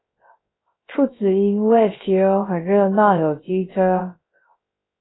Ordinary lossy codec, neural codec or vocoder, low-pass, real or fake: AAC, 16 kbps; codec, 16 kHz, 0.3 kbps, FocalCodec; 7.2 kHz; fake